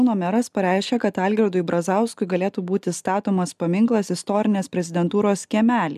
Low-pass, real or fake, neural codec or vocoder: 14.4 kHz; real; none